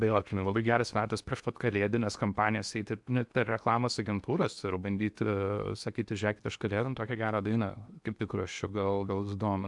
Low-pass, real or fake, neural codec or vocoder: 10.8 kHz; fake; codec, 16 kHz in and 24 kHz out, 0.8 kbps, FocalCodec, streaming, 65536 codes